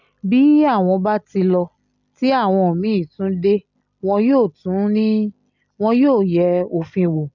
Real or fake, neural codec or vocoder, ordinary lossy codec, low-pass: real; none; none; 7.2 kHz